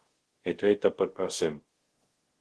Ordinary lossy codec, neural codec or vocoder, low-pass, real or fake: Opus, 16 kbps; codec, 24 kHz, 0.5 kbps, DualCodec; 10.8 kHz; fake